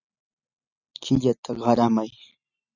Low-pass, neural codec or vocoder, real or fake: 7.2 kHz; none; real